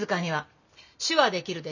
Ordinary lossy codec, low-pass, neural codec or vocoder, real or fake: none; 7.2 kHz; none; real